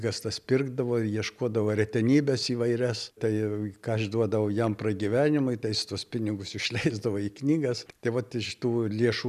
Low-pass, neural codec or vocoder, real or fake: 14.4 kHz; none; real